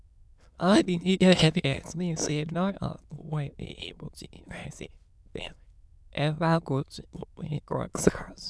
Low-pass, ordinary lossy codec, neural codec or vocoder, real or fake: none; none; autoencoder, 22.05 kHz, a latent of 192 numbers a frame, VITS, trained on many speakers; fake